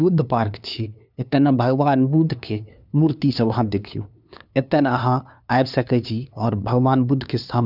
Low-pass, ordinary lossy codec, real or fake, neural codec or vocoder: 5.4 kHz; none; fake; codec, 16 kHz, 2 kbps, FunCodec, trained on LibriTTS, 25 frames a second